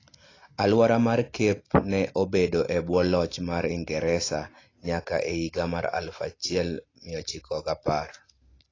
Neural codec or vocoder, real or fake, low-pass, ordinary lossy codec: none; real; 7.2 kHz; AAC, 32 kbps